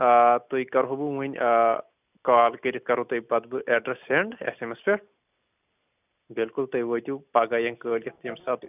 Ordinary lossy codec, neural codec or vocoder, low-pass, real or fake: none; none; 3.6 kHz; real